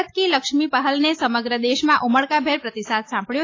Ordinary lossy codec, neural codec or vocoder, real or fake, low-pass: AAC, 32 kbps; none; real; 7.2 kHz